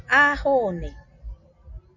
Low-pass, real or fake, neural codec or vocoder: 7.2 kHz; real; none